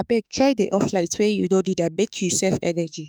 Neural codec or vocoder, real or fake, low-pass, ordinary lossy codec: autoencoder, 48 kHz, 32 numbers a frame, DAC-VAE, trained on Japanese speech; fake; none; none